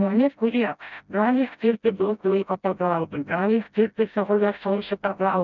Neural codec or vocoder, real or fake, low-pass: codec, 16 kHz, 0.5 kbps, FreqCodec, smaller model; fake; 7.2 kHz